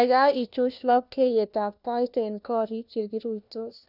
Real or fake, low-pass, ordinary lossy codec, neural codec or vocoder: fake; 5.4 kHz; none; codec, 16 kHz, 1 kbps, FunCodec, trained on LibriTTS, 50 frames a second